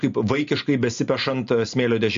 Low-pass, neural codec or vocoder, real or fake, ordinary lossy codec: 7.2 kHz; none; real; MP3, 48 kbps